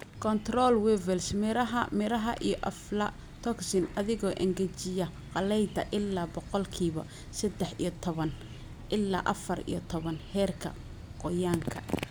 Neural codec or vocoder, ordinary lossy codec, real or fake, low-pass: none; none; real; none